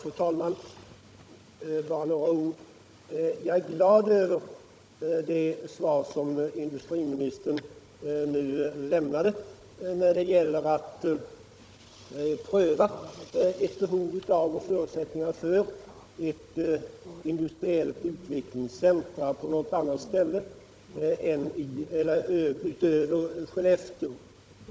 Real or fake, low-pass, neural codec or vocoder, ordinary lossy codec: fake; none; codec, 16 kHz, 16 kbps, FunCodec, trained on Chinese and English, 50 frames a second; none